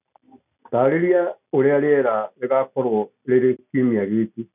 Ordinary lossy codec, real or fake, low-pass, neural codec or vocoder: none; real; 3.6 kHz; none